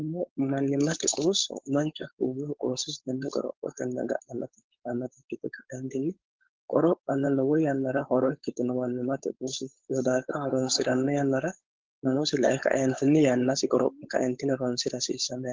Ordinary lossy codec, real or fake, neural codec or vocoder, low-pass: Opus, 16 kbps; fake; codec, 16 kHz, 4.8 kbps, FACodec; 7.2 kHz